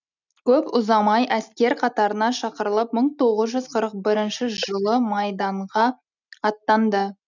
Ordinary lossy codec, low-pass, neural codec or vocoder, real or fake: none; 7.2 kHz; none; real